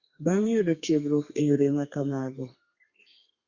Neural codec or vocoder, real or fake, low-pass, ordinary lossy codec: codec, 32 kHz, 1.9 kbps, SNAC; fake; 7.2 kHz; Opus, 64 kbps